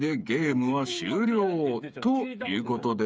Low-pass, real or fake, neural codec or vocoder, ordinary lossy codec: none; fake; codec, 16 kHz, 8 kbps, FreqCodec, smaller model; none